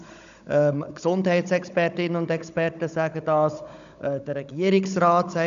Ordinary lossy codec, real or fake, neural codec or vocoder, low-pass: none; fake; codec, 16 kHz, 16 kbps, FunCodec, trained on Chinese and English, 50 frames a second; 7.2 kHz